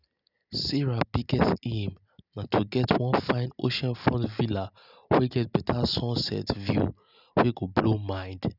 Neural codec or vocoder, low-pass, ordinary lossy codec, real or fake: none; 5.4 kHz; none; real